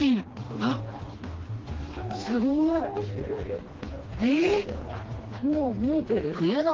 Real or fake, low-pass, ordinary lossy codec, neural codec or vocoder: fake; 7.2 kHz; Opus, 16 kbps; codec, 16 kHz, 2 kbps, FreqCodec, smaller model